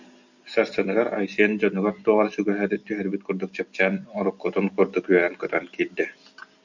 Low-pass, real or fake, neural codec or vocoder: 7.2 kHz; real; none